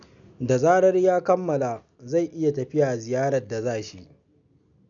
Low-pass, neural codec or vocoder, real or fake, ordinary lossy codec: 7.2 kHz; none; real; none